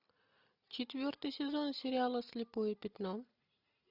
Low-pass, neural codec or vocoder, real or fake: 5.4 kHz; none; real